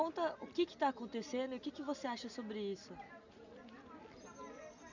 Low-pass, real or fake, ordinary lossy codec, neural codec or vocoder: 7.2 kHz; real; none; none